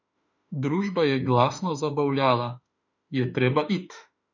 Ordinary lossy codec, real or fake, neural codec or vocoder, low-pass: none; fake; autoencoder, 48 kHz, 32 numbers a frame, DAC-VAE, trained on Japanese speech; 7.2 kHz